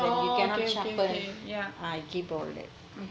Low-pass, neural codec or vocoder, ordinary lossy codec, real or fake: none; none; none; real